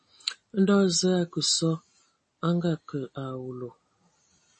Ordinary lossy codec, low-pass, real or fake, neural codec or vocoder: MP3, 32 kbps; 10.8 kHz; real; none